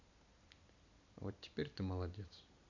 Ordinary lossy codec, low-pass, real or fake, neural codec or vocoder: none; 7.2 kHz; real; none